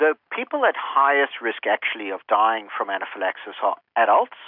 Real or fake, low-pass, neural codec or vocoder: real; 5.4 kHz; none